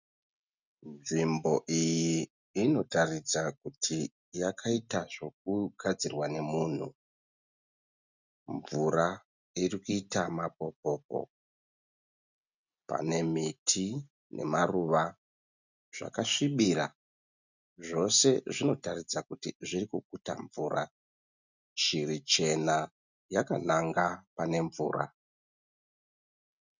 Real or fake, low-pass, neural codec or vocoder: real; 7.2 kHz; none